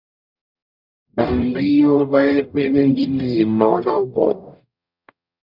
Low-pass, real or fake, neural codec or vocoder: 5.4 kHz; fake; codec, 44.1 kHz, 0.9 kbps, DAC